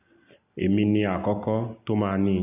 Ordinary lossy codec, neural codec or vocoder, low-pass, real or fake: none; none; 3.6 kHz; real